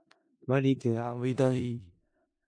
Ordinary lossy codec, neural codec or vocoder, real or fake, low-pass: MP3, 48 kbps; codec, 16 kHz in and 24 kHz out, 0.4 kbps, LongCat-Audio-Codec, four codebook decoder; fake; 9.9 kHz